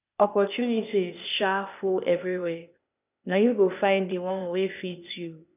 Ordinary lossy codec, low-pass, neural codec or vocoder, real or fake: none; 3.6 kHz; codec, 16 kHz, 0.8 kbps, ZipCodec; fake